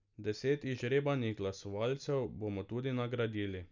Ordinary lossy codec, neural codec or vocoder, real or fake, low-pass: none; none; real; 7.2 kHz